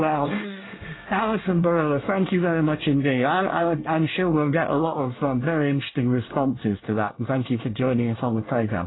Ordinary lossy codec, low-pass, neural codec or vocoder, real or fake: AAC, 16 kbps; 7.2 kHz; codec, 24 kHz, 1 kbps, SNAC; fake